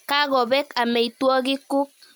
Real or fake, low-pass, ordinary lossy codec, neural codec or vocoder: real; none; none; none